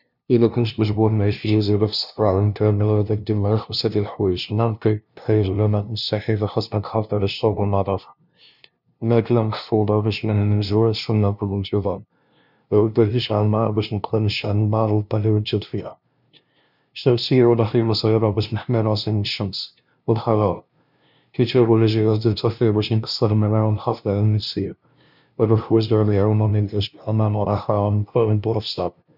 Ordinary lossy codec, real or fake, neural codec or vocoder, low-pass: none; fake; codec, 16 kHz, 0.5 kbps, FunCodec, trained on LibriTTS, 25 frames a second; 5.4 kHz